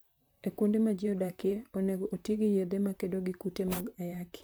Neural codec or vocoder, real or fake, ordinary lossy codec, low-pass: vocoder, 44.1 kHz, 128 mel bands, Pupu-Vocoder; fake; none; none